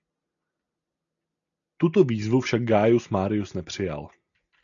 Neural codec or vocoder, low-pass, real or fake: none; 7.2 kHz; real